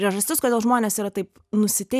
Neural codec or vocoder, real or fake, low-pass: none; real; 14.4 kHz